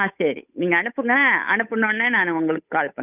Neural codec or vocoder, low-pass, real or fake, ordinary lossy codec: codec, 16 kHz, 8 kbps, FunCodec, trained on Chinese and English, 25 frames a second; 3.6 kHz; fake; none